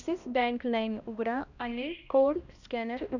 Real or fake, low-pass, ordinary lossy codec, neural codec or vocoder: fake; 7.2 kHz; none; codec, 16 kHz, 1 kbps, X-Codec, HuBERT features, trained on balanced general audio